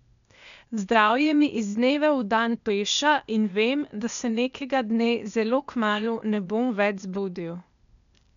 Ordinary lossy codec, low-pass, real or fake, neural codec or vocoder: none; 7.2 kHz; fake; codec, 16 kHz, 0.8 kbps, ZipCodec